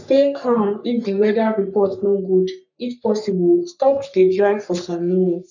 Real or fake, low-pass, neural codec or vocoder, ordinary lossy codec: fake; 7.2 kHz; codec, 44.1 kHz, 3.4 kbps, Pupu-Codec; none